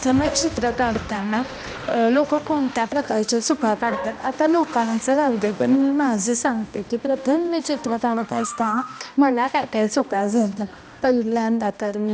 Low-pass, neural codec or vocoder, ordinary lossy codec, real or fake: none; codec, 16 kHz, 1 kbps, X-Codec, HuBERT features, trained on balanced general audio; none; fake